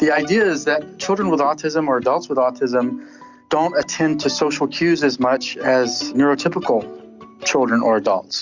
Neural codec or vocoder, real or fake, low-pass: none; real; 7.2 kHz